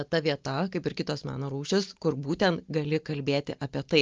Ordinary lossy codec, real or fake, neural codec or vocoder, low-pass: Opus, 24 kbps; real; none; 7.2 kHz